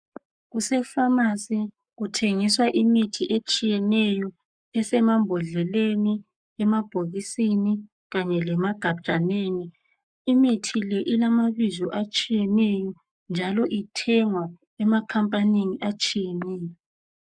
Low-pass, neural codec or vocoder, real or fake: 9.9 kHz; codec, 44.1 kHz, 7.8 kbps, Pupu-Codec; fake